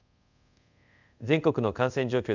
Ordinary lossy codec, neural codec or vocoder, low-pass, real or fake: none; codec, 24 kHz, 0.5 kbps, DualCodec; 7.2 kHz; fake